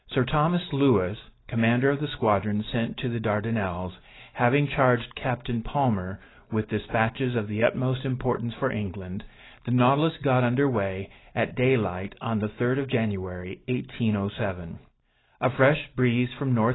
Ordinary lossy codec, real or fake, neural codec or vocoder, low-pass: AAC, 16 kbps; real; none; 7.2 kHz